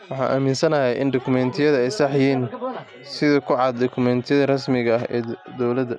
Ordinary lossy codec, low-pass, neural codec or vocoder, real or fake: none; none; none; real